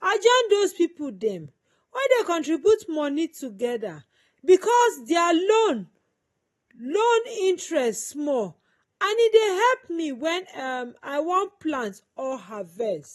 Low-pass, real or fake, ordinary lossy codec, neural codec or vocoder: 19.8 kHz; real; AAC, 32 kbps; none